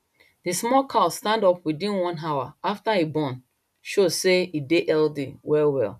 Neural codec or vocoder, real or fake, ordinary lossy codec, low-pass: none; real; none; 14.4 kHz